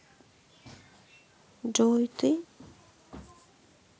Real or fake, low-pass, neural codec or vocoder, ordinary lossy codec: real; none; none; none